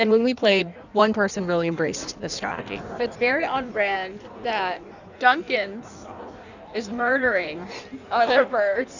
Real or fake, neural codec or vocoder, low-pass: fake; codec, 16 kHz in and 24 kHz out, 1.1 kbps, FireRedTTS-2 codec; 7.2 kHz